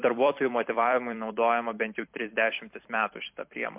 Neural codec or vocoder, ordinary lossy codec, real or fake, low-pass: vocoder, 44.1 kHz, 128 mel bands every 256 samples, BigVGAN v2; MP3, 32 kbps; fake; 3.6 kHz